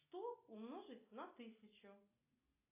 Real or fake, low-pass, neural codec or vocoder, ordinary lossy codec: real; 3.6 kHz; none; AAC, 32 kbps